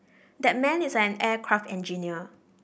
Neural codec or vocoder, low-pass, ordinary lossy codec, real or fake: none; none; none; real